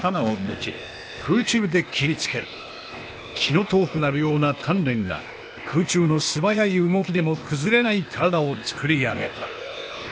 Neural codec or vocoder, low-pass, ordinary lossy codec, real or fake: codec, 16 kHz, 0.8 kbps, ZipCodec; none; none; fake